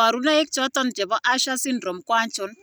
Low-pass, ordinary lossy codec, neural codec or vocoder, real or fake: none; none; none; real